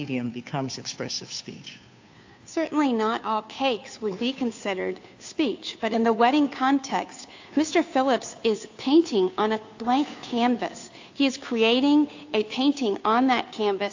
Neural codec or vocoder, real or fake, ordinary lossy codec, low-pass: codec, 16 kHz, 2 kbps, FunCodec, trained on Chinese and English, 25 frames a second; fake; AAC, 48 kbps; 7.2 kHz